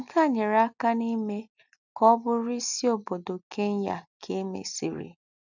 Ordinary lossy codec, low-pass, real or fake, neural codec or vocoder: none; 7.2 kHz; real; none